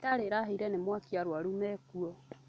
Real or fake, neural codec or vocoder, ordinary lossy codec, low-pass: real; none; none; none